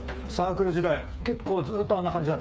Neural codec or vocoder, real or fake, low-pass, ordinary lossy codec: codec, 16 kHz, 4 kbps, FreqCodec, smaller model; fake; none; none